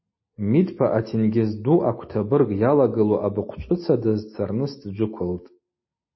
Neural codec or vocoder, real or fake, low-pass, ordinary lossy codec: none; real; 7.2 kHz; MP3, 24 kbps